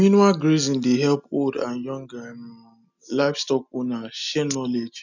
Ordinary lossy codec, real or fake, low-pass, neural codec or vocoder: none; real; 7.2 kHz; none